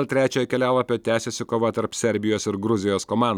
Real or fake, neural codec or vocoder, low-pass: real; none; 19.8 kHz